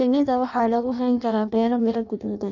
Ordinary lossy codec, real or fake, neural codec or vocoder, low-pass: none; fake; codec, 16 kHz in and 24 kHz out, 0.6 kbps, FireRedTTS-2 codec; 7.2 kHz